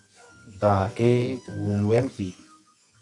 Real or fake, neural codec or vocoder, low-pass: fake; codec, 24 kHz, 0.9 kbps, WavTokenizer, medium music audio release; 10.8 kHz